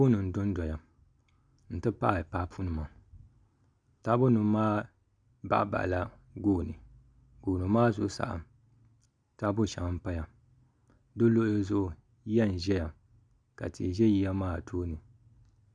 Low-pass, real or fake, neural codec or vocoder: 9.9 kHz; real; none